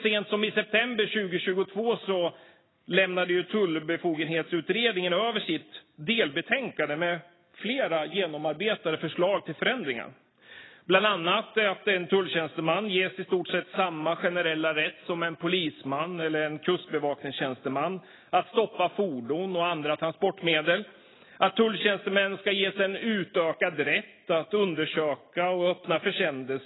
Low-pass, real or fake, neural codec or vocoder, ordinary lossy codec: 7.2 kHz; real; none; AAC, 16 kbps